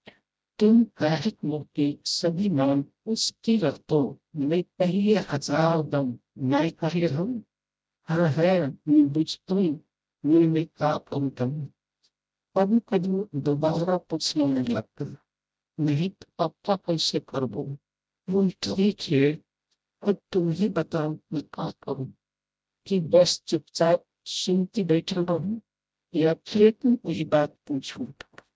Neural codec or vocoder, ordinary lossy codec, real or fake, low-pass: codec, 16 kHz, 0.5 kbps, FreqCodec, smaller model; none; fake; none